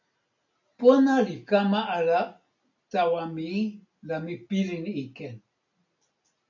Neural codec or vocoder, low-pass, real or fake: none; 7.2 kHz; real